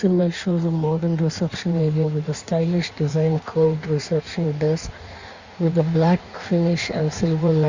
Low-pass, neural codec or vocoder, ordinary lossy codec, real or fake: 7.2 kHz; codec, 16 kHz in and 24 kHz out, 1.1 kbps, FireRedTTS-2 codec; Opus, 64 kbps; fake